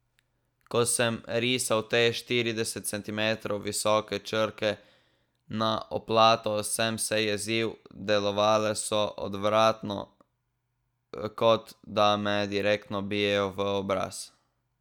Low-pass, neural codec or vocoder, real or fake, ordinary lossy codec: 19.8 kHz; none; real; none